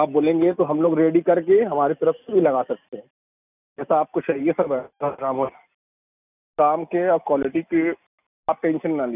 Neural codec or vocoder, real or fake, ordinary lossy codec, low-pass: none; real; none; 3.6 kHz